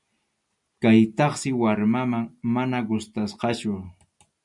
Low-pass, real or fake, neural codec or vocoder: 10.8 kHz; real; none